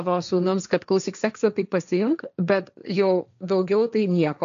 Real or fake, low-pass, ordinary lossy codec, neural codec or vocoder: fake; 7.2 kHz; AAC, 96 kbps; codec, 16 kHz, 1.1 kbps, Voila-Tokenizer